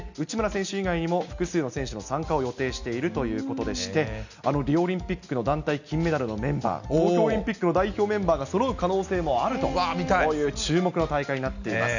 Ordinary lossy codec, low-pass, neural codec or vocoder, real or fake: none; 7.2 kHz; none; real